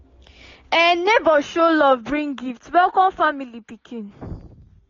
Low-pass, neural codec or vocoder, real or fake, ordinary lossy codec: 7.2 kHz; none; real; AAC, 32 kbps